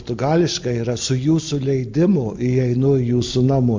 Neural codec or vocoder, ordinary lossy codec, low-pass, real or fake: none; MP3, 48 kbps; 7.2 kHz; real